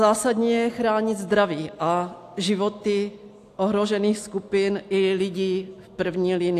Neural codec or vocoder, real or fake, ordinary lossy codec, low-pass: none; real; AAC, 64 kbps; 14.4 kHz